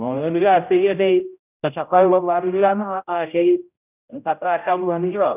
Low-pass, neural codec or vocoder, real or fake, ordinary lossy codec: 3.6 kHz; codec, 16 kHz, 0.5 kbps, X-Codec, HuBERT features, trained on general audio; fake; none